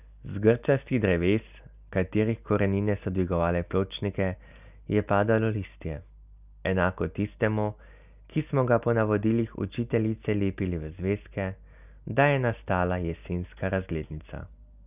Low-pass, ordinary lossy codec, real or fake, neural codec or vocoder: 3.6 kHz; none; real; none